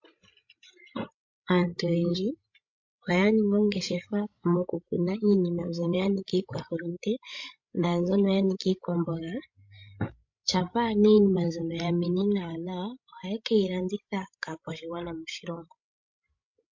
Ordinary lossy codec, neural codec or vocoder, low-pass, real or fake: MP3, 48 kbps; codec, 16 kHz, 16 kbps, FreqCodec, larger model; 7.2 kHz; fake